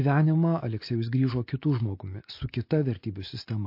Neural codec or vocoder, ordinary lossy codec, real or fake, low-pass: none; MP3, 32 kbps; real; 5.4 kHz